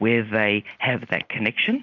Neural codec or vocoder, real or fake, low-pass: none; real; 7.2 kHz